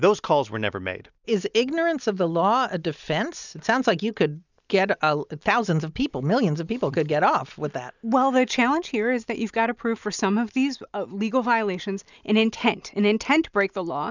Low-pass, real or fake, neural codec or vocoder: 7.2 kHz; real; none